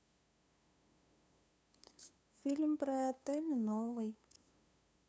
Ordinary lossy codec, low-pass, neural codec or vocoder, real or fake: none; none; codec, 16 kHz, 2 kbps, FunCodec, trained on LibriTTS, 25 frames a second; fake